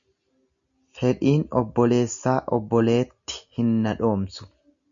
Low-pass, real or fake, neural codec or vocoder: 7.2 kHz; real; none